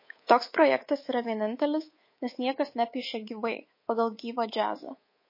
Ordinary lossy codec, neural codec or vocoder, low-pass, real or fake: MP3, 24 kbps; codec, 24 kHz, 3.1 kbps, DualCodec; 5.4 kHz; fake